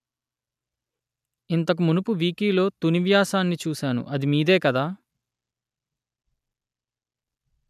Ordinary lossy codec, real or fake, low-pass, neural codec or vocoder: none; real; 14.4 kHz; none